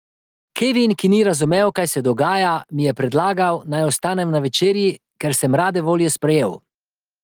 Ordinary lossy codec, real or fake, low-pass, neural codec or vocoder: Opus, 24 kbps; real; 19.8 kHz; none